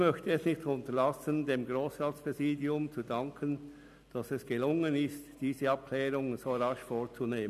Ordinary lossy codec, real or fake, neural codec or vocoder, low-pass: AAC, 96 kbps; real; none; 14.4 kHz